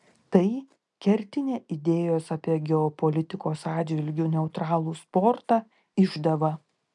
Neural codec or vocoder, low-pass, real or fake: none; 10.8 kHz; real